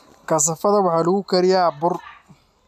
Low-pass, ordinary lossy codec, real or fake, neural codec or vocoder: 14.4 kHz; none; real; none